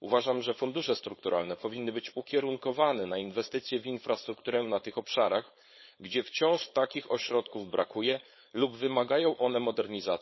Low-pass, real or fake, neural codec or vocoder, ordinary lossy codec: 7.2 kHz; fake; codec, 16 kHz, 4.8 kbps, FACodec; MP3, 24 kbps